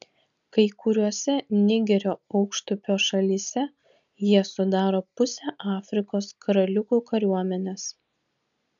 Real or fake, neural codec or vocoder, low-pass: real; none; 7.2 kHz